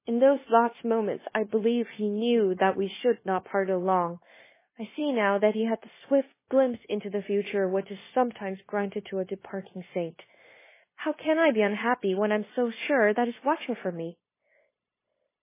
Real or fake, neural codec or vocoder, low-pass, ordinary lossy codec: fake; codec, 16 kHz, 0.9 kbps, LongCat-Audio-Codec; 3.6 kHz; MP3, 16 kbps